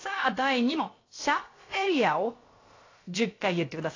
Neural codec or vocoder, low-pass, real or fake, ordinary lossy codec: codec, 16 kHz, about 1 kbps, DyCAST, with the encoder's durations; 7.2 kHz; fake; AAC, 32 kbps